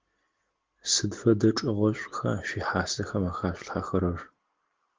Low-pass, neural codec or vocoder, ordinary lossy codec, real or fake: 7.2 kHz; none; Opus, 16 kbps; real